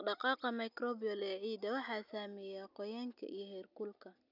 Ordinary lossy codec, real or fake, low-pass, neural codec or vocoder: none; real; 5.4 kHz; none